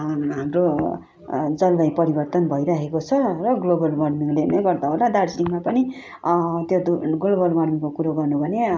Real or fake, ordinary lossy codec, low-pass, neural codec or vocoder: real; none; none; none